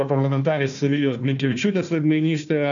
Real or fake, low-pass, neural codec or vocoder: fake; 7.2 kHz; codec, 16 kHz, 1 kbps, FunCodec, trained on Chinese and English, 50 frames a second